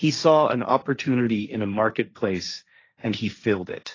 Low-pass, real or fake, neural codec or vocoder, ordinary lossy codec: 7.2 kHz; fake; codec, 16 kHz, 1.1 kbps, Voila-Tokenizer; AAC, 32 kbps